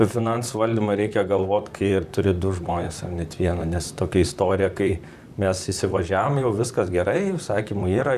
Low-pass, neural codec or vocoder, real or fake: 14.4 kHz; vocoder, 44.1 kHz, 128 mel bands, Pupu-Vocoder; fake